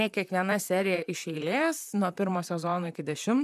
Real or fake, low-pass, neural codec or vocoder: fake; 14.4 kHz; vocoder, 44.1 kHz, 128 mel bands, Pupu-Vocoder